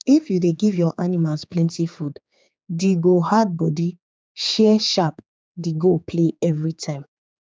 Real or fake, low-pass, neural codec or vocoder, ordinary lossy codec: fake; none; codec, 16 kHz, 4 kbps, X-Codec, HuBERT features, trained on general audio; none